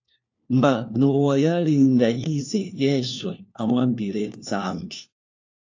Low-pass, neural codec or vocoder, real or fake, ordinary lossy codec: 7.2 kHz; codec, 16 kHz, 1 kbps, FunCodec, trained on LibriTTS, 50 frames a second; fake; AAC, 48 kbps